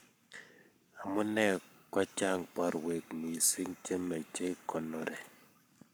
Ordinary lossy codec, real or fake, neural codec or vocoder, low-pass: none; fake; codec, 44.1 kHz, 7.8 kbps, Pupu-Codec; none